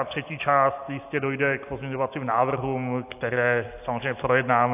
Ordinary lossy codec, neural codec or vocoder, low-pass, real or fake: AAC, 32 kbps; none; 3.6 kHz; real